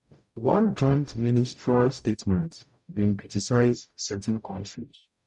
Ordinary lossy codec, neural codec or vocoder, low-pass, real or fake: none; codec, 44.1 kHz, 0.9 kbps, DAC; 10.8 kHz; fake